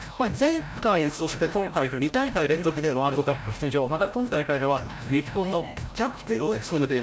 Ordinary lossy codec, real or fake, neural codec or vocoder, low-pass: none; fake; codec, 16 kHz, 0.5 kbps, FreqCodec, larger model; none